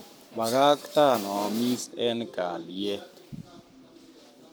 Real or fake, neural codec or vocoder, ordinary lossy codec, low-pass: fake; codec, 44.1 kHz, 7.8 kbps, Pupu-Codec; none; none